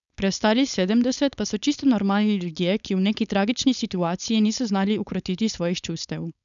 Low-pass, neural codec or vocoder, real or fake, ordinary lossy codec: 7.2 kHz; codec, 16 kHz, 4.8 kbps, FACodec; fake; none